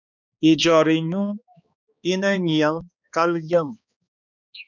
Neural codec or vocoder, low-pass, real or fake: codec, 16 kHz, 2 kbps, X-Codec, HuBERT features, trained on balanced general audio; 7.2 kHz; fake